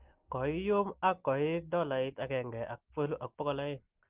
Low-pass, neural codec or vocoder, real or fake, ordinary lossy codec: 3.6 kHz; none; real; Opus, 16 kbps